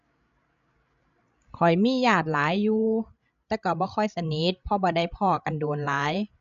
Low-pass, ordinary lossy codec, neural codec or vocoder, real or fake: 7.2 kHz; MP3, 96 kbps; codec, 16 kHz, 16 kbps, FreqCodec, larger model; fake